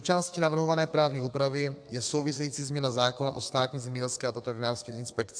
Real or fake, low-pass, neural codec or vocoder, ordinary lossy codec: fake; 9.9 kHz; codec, 32 kHz, 1.9 kbps, SNAC; AAC, 64 kbps